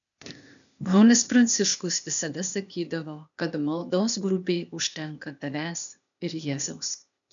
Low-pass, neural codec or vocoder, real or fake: 7.2 kHz; codec, 16 kHz, 0.8 kbps, ZipCodec; fake